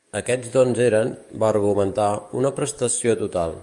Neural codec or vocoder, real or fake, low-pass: codec, 44.1 kHz, 7.8 kbps, DAC; fake; 10.8 kHz